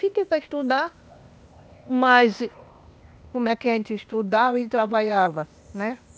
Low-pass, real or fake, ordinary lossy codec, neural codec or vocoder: none; fake; none; codec, 16 kHz, 0.8 kbps, ZipCodec